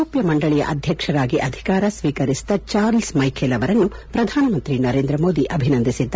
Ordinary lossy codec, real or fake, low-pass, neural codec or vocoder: none; real; none; none